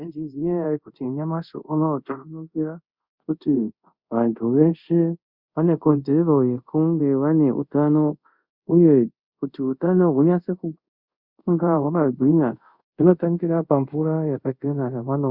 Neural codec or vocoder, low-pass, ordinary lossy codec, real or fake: codec, 24 kHz, 0.5 kbps, DualCodec; 5.4 kHz; Opus, 64 kbps; fake